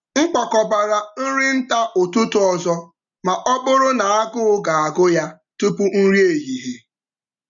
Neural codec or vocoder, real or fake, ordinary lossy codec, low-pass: none; real; none; 7.2 kHz